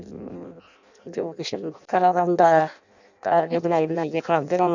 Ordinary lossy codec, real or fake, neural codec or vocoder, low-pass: none; fake; codec, 16 kHz in and 24 kHz out, 0.6 kbps, FireRedTTS-2 codec; 7.2 kHz